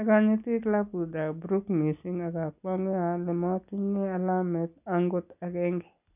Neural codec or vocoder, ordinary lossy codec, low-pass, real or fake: none; none; 3.6 kHz; real